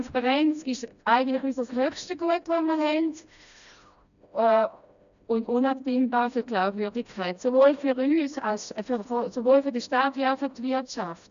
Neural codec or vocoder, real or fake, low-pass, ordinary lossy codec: codec, 16 kHz, 1 kbps, FreqCodec, smaller model; fake; 7.2 kHz; none